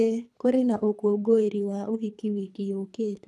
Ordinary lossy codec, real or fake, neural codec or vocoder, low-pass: none; fake; codec, 24 kHz, 3 kbps, HILCodec; none